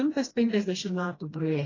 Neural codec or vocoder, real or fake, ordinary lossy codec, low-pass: codec, 16 kHz, 1 kbps, FreqCodec, smaller model; fake; AAC, 32 kbps; 7.2 kHz